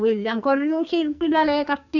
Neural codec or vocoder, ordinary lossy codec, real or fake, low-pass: codec, 44.1 kHz, 2.6 kbps, SNAC; none; fake; 7.2 kHz